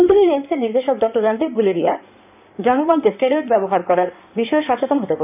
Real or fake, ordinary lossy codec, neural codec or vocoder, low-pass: fake; none; codec, 16 kHz in and 24 kHz out, 2.2 kbps, FireRedTTS-2 codec; 3.6 kHz